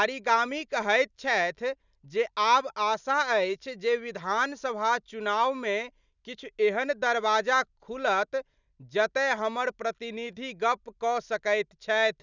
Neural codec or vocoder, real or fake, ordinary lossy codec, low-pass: none; real; none; 7.2 kHz